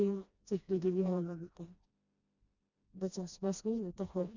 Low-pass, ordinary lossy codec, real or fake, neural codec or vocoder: 7.2 kHz; Opus, 64 kbps; fake; codec, 16 kHz, 1 kbps, FreqCodec, smaller model